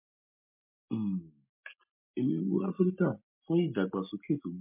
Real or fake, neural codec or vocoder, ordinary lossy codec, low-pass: real; none; MP3, 32 kbps; 3.6 kHz